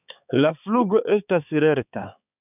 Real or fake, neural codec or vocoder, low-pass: fake; codec, 16 kHz, 4 kbps, X-Codec, HuBERT features, trained on balanced general audio; 3.6 kHz